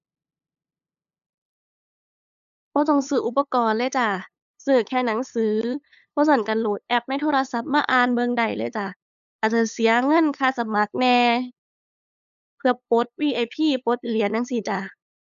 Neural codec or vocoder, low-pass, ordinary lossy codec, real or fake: codec, 16 kHz, 8 kbps, FunCodec, trained on LibriTTS, 25 frames a second; 7.2 kHz; none; fake